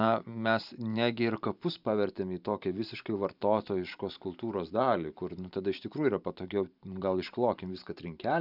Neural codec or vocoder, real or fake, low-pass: vocoder, 44.1 kHz, 128 mel bands every 512 samples, BigVGAN v2; fake; 5.4 kHz